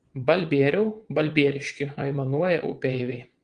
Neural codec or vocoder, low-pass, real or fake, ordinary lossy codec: vocoder, 22.05 kHz, 80 mel bands, WaveNeXt; 9.9 kHz; fake; Opus, 24 kbps